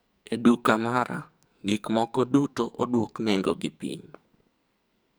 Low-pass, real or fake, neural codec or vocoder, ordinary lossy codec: none; fake; codec, 44.1 kHz, 2.6 kbps, SNAC; none